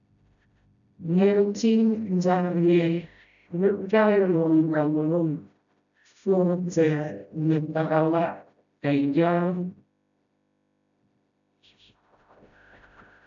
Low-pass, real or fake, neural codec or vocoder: 7.2 kHz; fake; codec, 16 kHz, 0.5 kbps, FreqCodec, smaller model